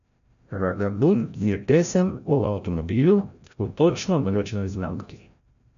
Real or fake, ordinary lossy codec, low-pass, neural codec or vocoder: fake; none; 7.2 kHz; codec, 16 kHz, 0.5 kbps, FreqCodec, larger model